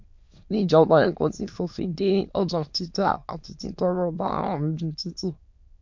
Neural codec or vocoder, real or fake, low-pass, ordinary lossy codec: autoencoder, 22.05 kHz, a latent of 192 numbers a frame, VITS, trained on many speakers; fake; 7.2 kHz; MP3, 48 kbps